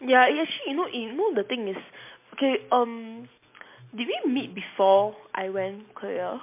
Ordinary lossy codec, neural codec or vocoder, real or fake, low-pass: MP3, 24 kbps; none; real; 3.6 kHz